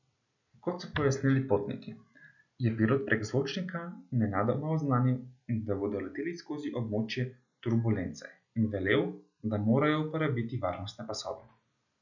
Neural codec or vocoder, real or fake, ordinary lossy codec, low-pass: none; real; none; 7.2 kHz